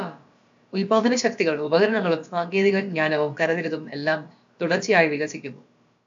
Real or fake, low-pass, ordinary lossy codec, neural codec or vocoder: fake; 7.2 kHz; AAC, 64 kbps; codec, 16 kHz, about 1 kbps, DyCAST, with the encoder's durations